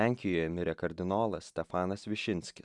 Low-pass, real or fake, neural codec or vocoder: 10.8 kHz; real; none